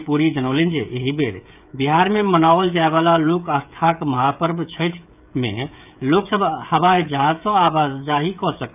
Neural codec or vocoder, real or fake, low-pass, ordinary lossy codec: codec, 16 kHz, 16 kbps, FreqCodec, smaller model; fake; 3.6 kHz; none